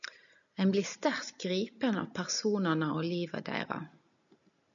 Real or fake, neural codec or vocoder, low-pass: real; none; 7.2 kHz